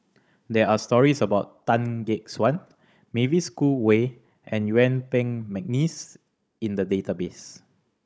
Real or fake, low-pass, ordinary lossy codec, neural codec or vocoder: fake; none; none; codec, 16 kHz, 16 kbps, FunCodec, trained on Chinese and English, 50 frames a second